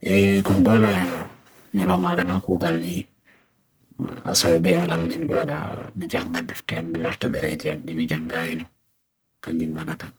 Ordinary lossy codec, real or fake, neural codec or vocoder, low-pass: none; fake; codec, 44.1 kHz, 1.7 kbps, Pupu-Codec; none